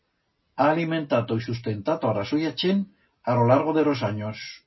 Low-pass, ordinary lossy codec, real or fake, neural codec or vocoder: 7.2 kHz; MP3, 24 kbps; real; none